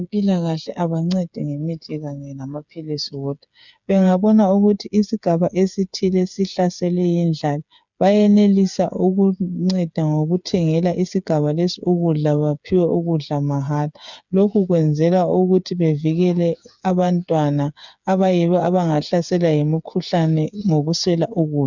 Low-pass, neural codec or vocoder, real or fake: 7.2 kHz; codec, 16 kHz, 8 kbps, FreqCodec, smaller model; fake